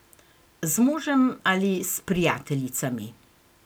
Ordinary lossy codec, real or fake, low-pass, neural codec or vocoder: none; real; none; none